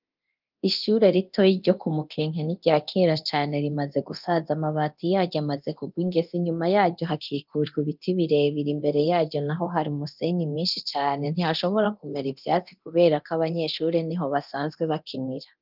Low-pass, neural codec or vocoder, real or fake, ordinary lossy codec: 5.4 kHz; codec, 24 kHz, 0.9 kbps, DualCodec; fake; Opus, 24 kbps